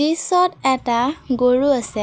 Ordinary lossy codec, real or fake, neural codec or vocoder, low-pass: none; real; none; none